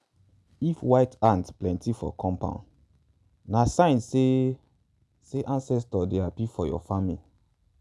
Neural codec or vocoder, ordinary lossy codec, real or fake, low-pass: none; none; real; none